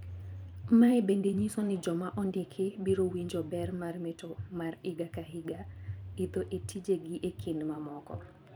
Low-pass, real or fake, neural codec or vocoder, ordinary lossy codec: none; fake; vocoder, 44.1 kHz, 128 mel bands every 512 samples, BigVGAN v2; none